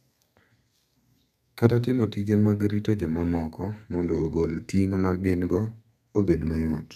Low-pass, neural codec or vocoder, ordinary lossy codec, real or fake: 14.4 kHz; codec, 32 kHz, 1.9 kbps, SNAC; none; fake